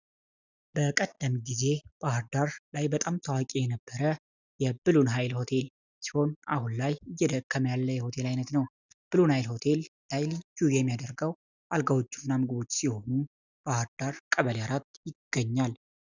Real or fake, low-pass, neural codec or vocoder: real; 7.2 kHz; none